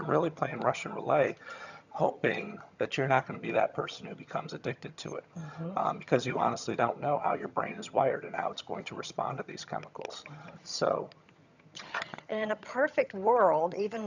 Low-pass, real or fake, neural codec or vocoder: 7.2 kHz; fake; vocoder, 22.05 kHz, 80 mel bands, HiFi-GAN